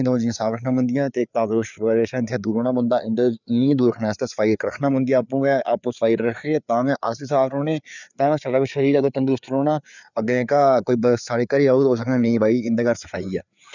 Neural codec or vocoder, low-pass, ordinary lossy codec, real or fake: codec, 16 kHz, 4 kbps, FreqCodec, larger model; 7.2 kHz; none; fake